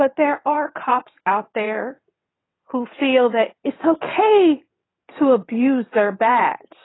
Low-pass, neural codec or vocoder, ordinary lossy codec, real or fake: 7.2 kHz; vocoder, 22.05 kHz, 80 mel bands, WaveNeXt; AAC, 16 kbps; fake